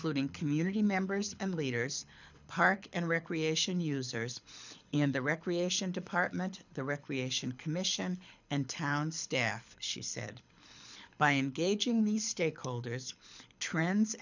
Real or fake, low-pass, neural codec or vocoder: fake; 7.2 kHz; codec, 24 kHz, 6 kbps, HILCodec